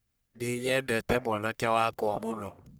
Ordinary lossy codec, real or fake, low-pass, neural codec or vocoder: none; fake; none; codec, 44.1 kHz, 1.7 kbps, Pupu-Codec